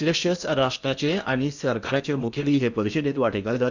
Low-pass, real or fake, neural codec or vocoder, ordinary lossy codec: 7.2 kHz; fake; codec, 16 kHz in and 24 kHz out, 0.8 kbps, FocalCodec, streaming, 65536 codes; none